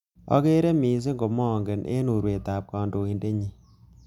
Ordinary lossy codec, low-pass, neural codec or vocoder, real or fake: none; 19.8 kHz; none; real